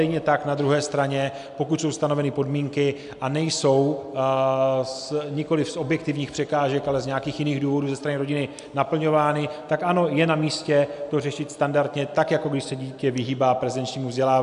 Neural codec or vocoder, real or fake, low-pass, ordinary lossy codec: none; real; 10.8 kHz; AAC, 96 kbps